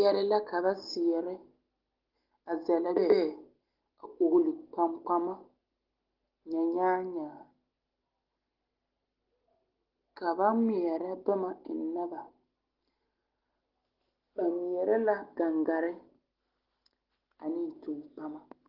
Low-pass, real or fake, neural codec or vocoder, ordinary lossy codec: 5.4 kHz; real; none; Opus, 16 kbps